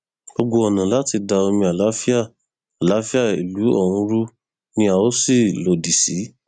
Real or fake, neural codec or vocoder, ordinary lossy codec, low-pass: real; none; none; 9.9 kHz